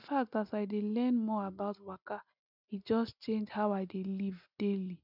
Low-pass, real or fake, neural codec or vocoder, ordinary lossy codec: 5.4 kHz; real; none; none